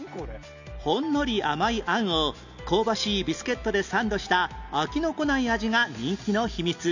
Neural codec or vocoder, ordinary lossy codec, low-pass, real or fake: none; none; 7.2 kHz; real